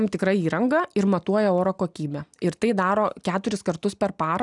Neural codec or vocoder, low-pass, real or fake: none; 10.8 kHz; real